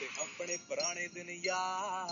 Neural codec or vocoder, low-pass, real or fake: none; 7.2 kHz; real